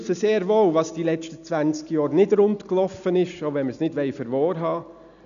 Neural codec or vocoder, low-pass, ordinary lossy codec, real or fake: none; 7.2 kHz; none; real